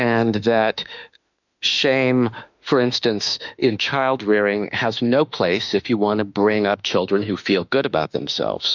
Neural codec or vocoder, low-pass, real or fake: autoencoder, 48 kHz, 32 numbers a frame, DAC-VAE, trained on Japanese speech; 7.2 kHz; fake